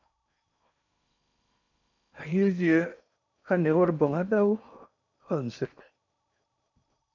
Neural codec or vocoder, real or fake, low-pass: codec, 16 kHz in and 24 kHz out, 0.6 kbps, FocalCodec, streaming, 4096 codes; fake; 7.2 kHz